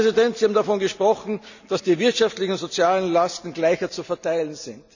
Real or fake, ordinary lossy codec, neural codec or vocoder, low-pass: real; none; none; 7.2 kHz